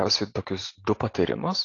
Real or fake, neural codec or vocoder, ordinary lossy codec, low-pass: real; none; AAC, 32 kbps; 7.2 kHz